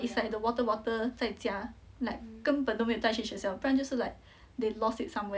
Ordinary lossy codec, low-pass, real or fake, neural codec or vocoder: none; none; real; none